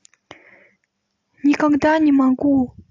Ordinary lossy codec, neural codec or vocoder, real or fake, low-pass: AAC, 48 kbps; none; real; 7.2 kHz